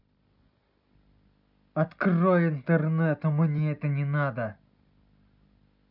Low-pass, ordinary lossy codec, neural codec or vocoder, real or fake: 5.4 kHz; none; none; real